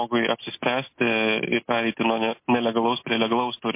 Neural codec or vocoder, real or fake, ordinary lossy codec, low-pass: none; real; MP3, 24 kbps; 3.6 kHz